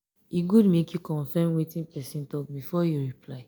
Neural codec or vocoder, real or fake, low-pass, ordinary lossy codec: none; real; none; none